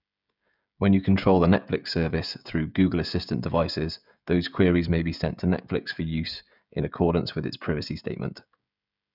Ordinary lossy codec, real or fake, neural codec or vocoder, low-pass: none; fake; codec, 16 kHz, 16 kbps, FreqCodec, smaller model; 5.4 kHz